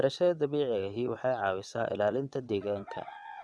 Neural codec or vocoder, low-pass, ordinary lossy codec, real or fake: vocoder, 22.05 kHz, 80 mel bands, WaveNeXt; none; none; fake